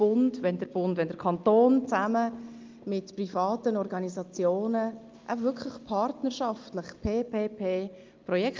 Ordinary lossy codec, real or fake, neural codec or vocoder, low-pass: Opus, 24 kbps; real; none; 7.2 kHz